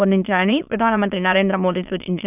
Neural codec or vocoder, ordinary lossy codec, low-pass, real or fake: autoencoder, 22.05 kHz, a latent of 192 numbers a frame, VITS, trained on many speakers; none; 3.6 kHz; fake